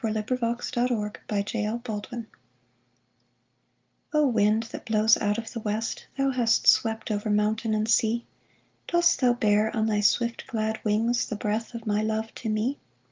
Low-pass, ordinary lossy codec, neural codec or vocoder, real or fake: 7.2 kHz; Opus, 24 kbps; none; real